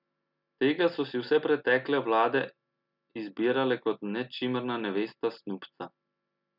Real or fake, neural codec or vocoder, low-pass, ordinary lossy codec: real; none; 5.4 kHz; none